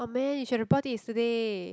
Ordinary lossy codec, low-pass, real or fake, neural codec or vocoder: none; none; real; none